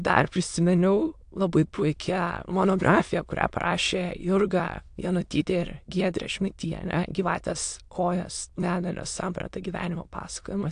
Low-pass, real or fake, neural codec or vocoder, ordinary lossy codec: 9.9 kHz; fake; autoencoder, 22.05 kHz, a latent of 192 numbers a frame, VITS, trained on many speakers; AAC, 64 kbps